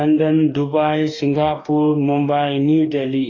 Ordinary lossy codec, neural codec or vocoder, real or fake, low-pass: AAC, 32 kbps; codec, 44.1 kHz, 2.6 kbps, DAC; fake; 7.2 kHz